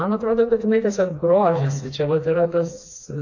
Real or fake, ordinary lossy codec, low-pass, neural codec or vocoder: fake; AAC, 48 kbps; 7.2 kHz; codec, 16 kHz, 2 kbps, FreqCodec, smaller model